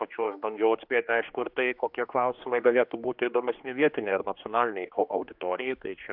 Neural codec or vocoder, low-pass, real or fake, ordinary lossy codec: codec, 16 kHz, 2 kbps, X-Codec, HuBERT features, trained on general audio; 5.4 kHz; fake; AAC, 48 kbps